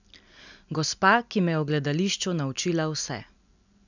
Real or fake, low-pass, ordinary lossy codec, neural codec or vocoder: real; 7.2 kHz; none; none